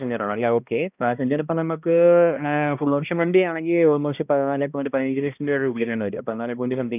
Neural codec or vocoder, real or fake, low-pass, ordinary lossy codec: codec, 16 kHz, 1 kbps, X-Codec, HuBERT features, trained on balanced general audio; fake; 3.6 kHz; none